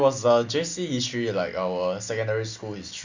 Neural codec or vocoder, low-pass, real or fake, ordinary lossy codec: none; 7.2 kHz; real; Opus, 64 kbps